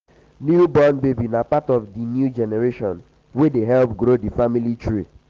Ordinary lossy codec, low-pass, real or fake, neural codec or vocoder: Opus, 16 kbps; 7.2 kHz; real; none